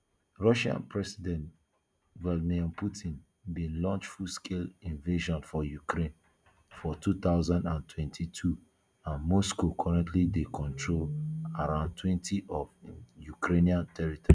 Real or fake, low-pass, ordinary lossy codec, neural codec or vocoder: real; 9.9 kHz; none; none